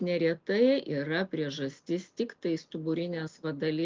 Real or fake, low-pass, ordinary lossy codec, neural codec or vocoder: real; 7.2 kHz; Opus, 24 kbps; none